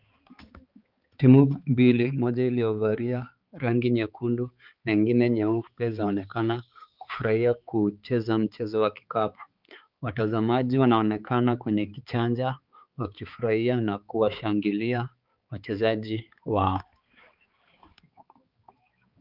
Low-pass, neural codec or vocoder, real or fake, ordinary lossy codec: 5.4 kHz; codec, 16 kHz, 4 kbps, X-Codec, HuBERT features, trained on balanced general audio; fake; Opus, 24 kbps